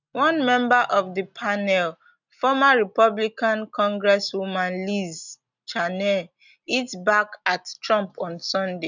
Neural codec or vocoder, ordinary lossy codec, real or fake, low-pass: none; none; real; 7.2 kHz